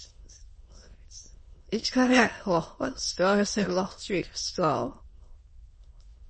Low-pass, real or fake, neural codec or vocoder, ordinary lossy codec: 9.9 kHz; fake; autoencoder, 22.05 kHz, a latent of 192 numbers a frame, VITS, trained on many speakers; MP3, 32 kbps